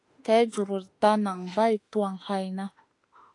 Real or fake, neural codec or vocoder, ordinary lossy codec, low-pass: fake; autoencoder, 48 kHz, 32 numbers a frame, DAC-VAE, trained on Japanese speech; AAC, 48 kbps; 10.8 kHz